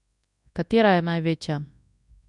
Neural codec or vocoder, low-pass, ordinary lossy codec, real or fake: codec, 24 kHz, 0.9 kbps, WavTokenizer, large speech release; 10.8 kHz; none; fake